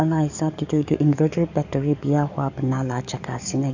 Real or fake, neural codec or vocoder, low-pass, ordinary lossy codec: fake; codec, 44.1 kHz, 7.8 kbps, DAC; 7.2 kHz; none